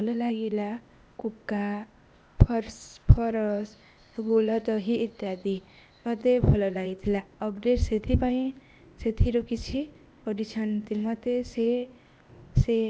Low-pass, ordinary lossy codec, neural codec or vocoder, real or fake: none; none; codec, 16 kHz, 0.8 kbps, ZipCodec; fake